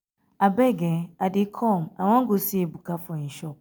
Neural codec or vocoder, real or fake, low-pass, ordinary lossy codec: none; real; none; none